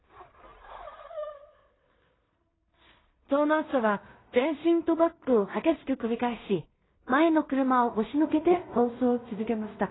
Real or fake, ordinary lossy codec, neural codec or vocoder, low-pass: fake; AAC, 16 kbps; codec, 16 kHz in and 24 kHz out, 0.4 kbps, LongCat-Audio-Codec, two codebook decoder; 7.2 kHz